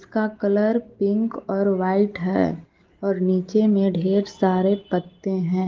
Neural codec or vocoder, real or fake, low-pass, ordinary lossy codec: none; real; 7.2 kHz; Opus, 16 kbps